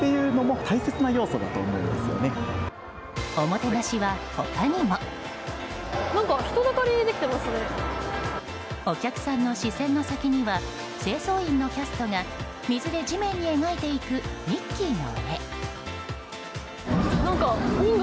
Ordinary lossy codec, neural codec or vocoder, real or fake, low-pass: none; none; real; none